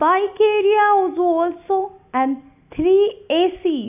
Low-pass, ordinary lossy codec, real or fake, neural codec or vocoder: 3.6 kHz; AAC, 32 kbps; real; none